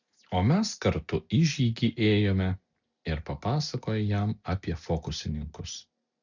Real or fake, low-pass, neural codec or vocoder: real; 7.2 kHz; none